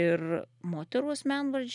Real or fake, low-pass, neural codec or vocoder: real; 10.8 kHz; none